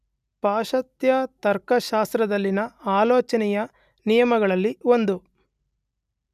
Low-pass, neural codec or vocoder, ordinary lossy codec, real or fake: 14.4 kHz; none; none; real